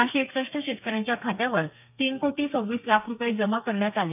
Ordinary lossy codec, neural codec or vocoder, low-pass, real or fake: none; codec, 32 kHz, 1.9 kbps, SNAC; 3.6 kHz; fake